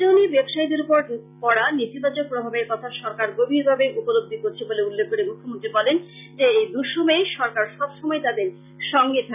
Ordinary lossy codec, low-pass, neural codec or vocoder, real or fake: none; 3.6 kHz; none; real